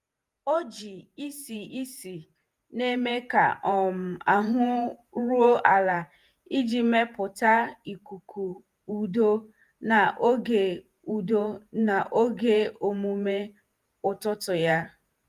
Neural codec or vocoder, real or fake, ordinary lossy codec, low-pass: vocoder, 48 kHz, 128 mel bands, Vocos; fake; Opus, 32 kbps; 14.4 kHz